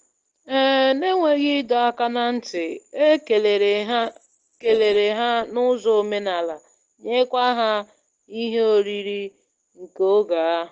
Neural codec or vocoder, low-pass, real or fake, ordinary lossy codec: none; 7.2 kHz; real; Opus, 16 kbps